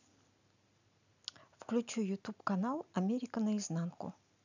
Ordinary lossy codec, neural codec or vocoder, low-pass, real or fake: none; none; 7.2 kHz; real